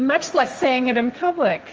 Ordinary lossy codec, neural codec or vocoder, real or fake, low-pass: Opus, 24 kbps; codec, 16 kHz, 1.1 kbps, Voila-Tokenizer; fake; 7.2 kHz